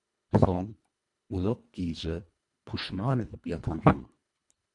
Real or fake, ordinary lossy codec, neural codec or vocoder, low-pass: fake; MP3, 64 kbps; codec, 24 kHz, 1.5 kbps, HILCodec; 10.8 kHz